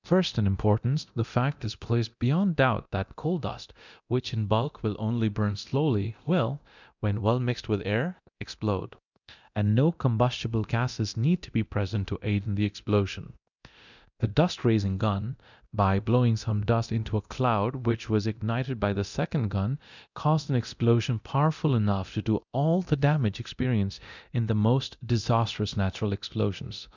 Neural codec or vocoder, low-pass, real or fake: codec, 24 kHz, 0.9 kbps, DualCodec; 7.2 kHz; fake